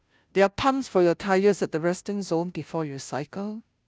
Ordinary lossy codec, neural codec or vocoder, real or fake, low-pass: none; codec, 16 kHz, 0.5 kbps, FunCodec, trained on Chinese and English, 25 frames a second; fake; none